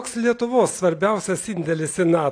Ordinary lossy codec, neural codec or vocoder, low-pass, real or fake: AAC, 48 kbps; none; 9.9 kHz; real